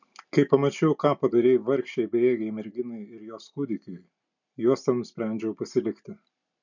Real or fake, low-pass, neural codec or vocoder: real; 7.2 kHz; none